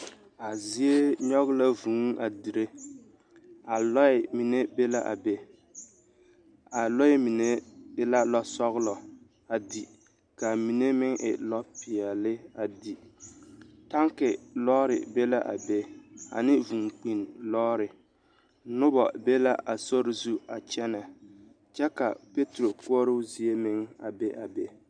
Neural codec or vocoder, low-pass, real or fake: none; 9.9 kHz; real